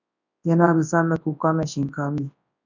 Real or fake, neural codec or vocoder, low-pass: fake; codec, 24 kHz, 0.9 kbps, WavTokenizer, large speech release; 7.2 kHz